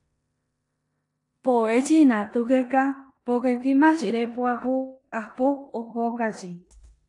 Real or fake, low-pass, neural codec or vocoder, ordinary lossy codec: fake; 10.8 kHz; codec, 16 kHz in and 24 kHz out, 0.9 kbps, LongCat-Audio-Codec, four codebook decoder; AAC, 48 kbps